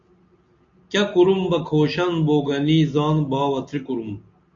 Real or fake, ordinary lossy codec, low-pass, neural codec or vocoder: real; AAC, 64 kbps; 7.2 kHz; none